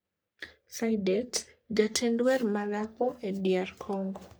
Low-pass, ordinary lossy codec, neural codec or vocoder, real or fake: none; none; codec, 44.1 kHz, 3.4 kbps, Pupu-Codec; fake